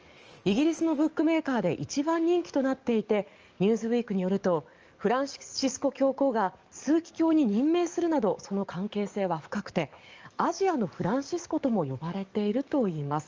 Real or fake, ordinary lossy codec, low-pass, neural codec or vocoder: fake; Opus, 24 kbps; 7.2 kHz; codec, 44.1 kHz, 7.8 kbps, DAC